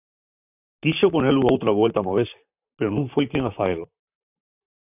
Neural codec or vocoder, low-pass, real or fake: vocoder, 44.1 kHz, 128 mel bands, Pupu-Vocoder; 3.6 kHz; fake